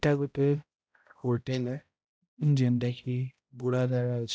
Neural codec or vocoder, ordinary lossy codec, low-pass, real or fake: codec, 16 kHz, 0.5 kbps, X-Codec, HuBERT features, trained on balanced general audio; none; none; fake